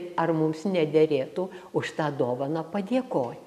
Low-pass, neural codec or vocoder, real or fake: 14.4 kHz; none; real